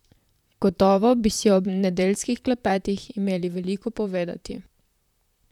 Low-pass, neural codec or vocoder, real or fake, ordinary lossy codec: 19.8 kHz; vocoder, 44.1 kHz, 128 mel bands, Pupu-Vocoder; fake; none